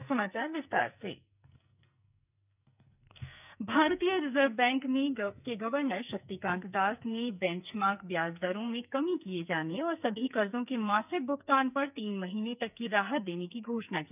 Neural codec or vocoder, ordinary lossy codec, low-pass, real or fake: codec, 44.1 kHz, 2.6 kbps, SNAC; none; 3.6 kHz; fake